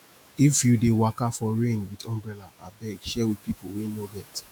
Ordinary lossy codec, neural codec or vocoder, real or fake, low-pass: none; autoencoder, 48 kHz, 128 numbers a frame, DAC-VAE, trained on Japanese speech; fake; none